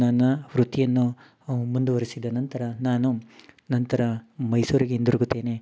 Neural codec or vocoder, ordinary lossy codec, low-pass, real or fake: none; none; none; real